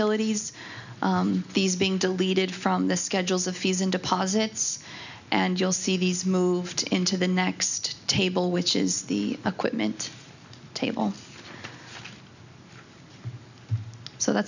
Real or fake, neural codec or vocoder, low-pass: real; none; 7.2 kHz